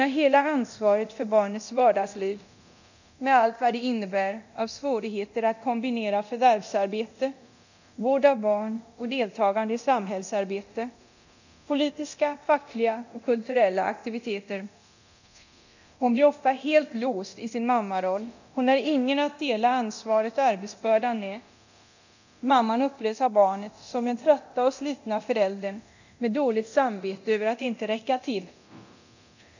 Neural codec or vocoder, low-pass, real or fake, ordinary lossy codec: codec, 24 kHz, 0.9 kbps, DualCodec; 7.2 kHz; fake; none